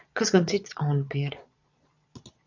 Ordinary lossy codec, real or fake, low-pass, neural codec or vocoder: AAC, 48 kbps; fake; 7.2 kHz; vocoder, 22.05 kHz, 80 mel bands, Vocos